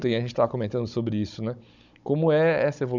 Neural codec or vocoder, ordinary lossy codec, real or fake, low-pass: codec, 16 kHz, 16 kbps, FunCodec, trained on Chinese and English, 50 frames a second; none; fake; 7.2 kHz